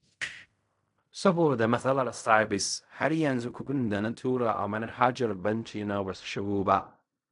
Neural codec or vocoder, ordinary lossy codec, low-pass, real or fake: codec, 16 kHz in and 24 kHz out, 0.4 kbps, LongCat-Audio-Codec, fine tuned four codebook decoder; none; 10.8 kHz; fake